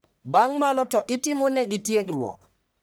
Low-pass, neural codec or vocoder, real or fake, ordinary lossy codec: none; codec, 44.1 kHz, 1.7 kbps, Pupu-Codec; fake; none